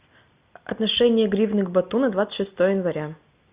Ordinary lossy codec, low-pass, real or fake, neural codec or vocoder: Opus, 64 kbps; 3.6 kHz; real; none